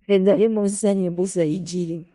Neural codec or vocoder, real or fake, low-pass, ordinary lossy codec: codec, 16 kHz in and 24 kHz out, 0.4 kbps, LongCat-Audio-Codec, four codebook decoder; fake; 10.8 kHz; Opus, 64 kbps